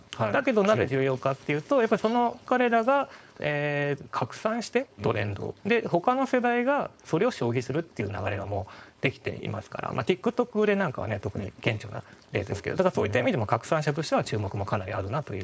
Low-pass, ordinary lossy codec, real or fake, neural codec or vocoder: none; none; fake; codec, 16 kHz, 4.8 kbps, FACodec